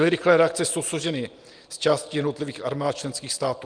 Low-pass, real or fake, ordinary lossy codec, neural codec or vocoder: 9.9 kHz; real; Opus, 32 kbps; none